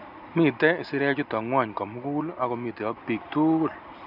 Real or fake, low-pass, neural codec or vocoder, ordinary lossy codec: real; 5.4 kHz; none; none